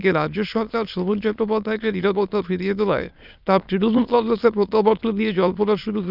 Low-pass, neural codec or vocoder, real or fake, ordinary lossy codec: 5.4 kHz; autoencoder, 22.05 kHz, a latent of 192 numbers a frame, VITS, trained on many speakers; fake; none